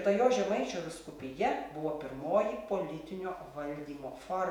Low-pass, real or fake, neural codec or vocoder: 19.8 kHz; fake; vocoder, 48 kHz, 128 mel bands, Vocos